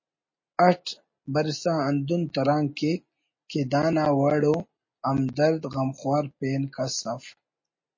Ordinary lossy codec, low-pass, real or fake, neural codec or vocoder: MP3, 32 kbps; 7.2 kHz; real; none